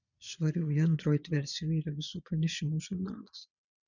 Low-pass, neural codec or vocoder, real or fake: 7.2 kHz; vocoder, 22.05 kHz, 80 mel bands, Vocos; fake